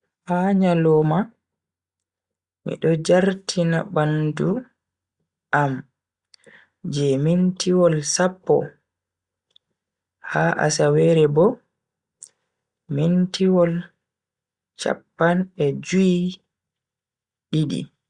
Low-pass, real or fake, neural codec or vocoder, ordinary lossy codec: 10.8 kHz; real; none; Opus, 64 kbps